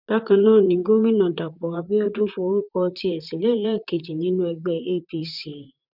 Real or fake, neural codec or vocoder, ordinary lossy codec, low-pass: fake; vocoder, 44.1 kHz, 128 mel bands, Pupu-Vocoder; none; 5.4 kHz